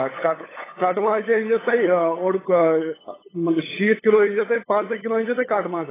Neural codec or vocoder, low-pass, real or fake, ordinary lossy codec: codec, 16 kHz, 8 kbps, FunCodec, trained on LibriTTS, 25 frames a second; 3.6 kHz; fake; AAC, 16 kbps